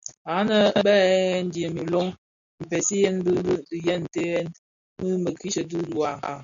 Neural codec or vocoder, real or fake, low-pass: none; real; 7.2 kHz